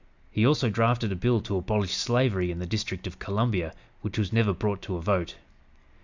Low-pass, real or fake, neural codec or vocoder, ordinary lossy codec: 7.2 kHz; real; none; Opus, 64 kbps